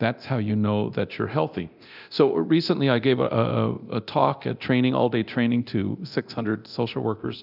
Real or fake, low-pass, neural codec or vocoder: fake; 5.4 kHz; codec, 24 kHz, 0.9 kbps, DualCodec